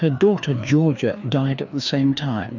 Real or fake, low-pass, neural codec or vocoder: fake; 7.2 kHz; codec, 16 kHz, 2 kbps, FreqCodec, larger model